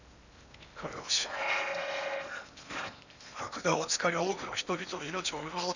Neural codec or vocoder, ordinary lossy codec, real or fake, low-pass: codec, 16 kHz in and 24 kHz out, 0.8 kbps, FocalCodec, streaming, 65536 codes; none; fake; 7.2 kHz